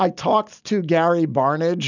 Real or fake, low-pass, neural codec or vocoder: real; 7.2 kHz; none